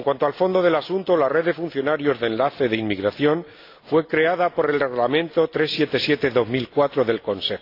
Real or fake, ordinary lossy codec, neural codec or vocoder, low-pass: real; AAC, 32 kbps; none; 5.4 kHz